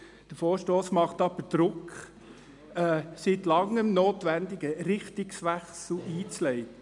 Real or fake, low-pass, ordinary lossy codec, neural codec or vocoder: real; 10.8 kHz; none; none